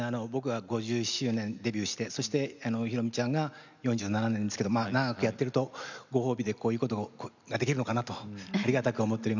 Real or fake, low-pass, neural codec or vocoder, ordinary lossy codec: real; 7.2 kHz; none; none